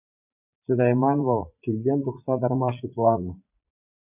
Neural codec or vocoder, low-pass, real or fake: vocoder, 44.1 kHz, 80 mel bands, Vocos; 3.6 kHz; fake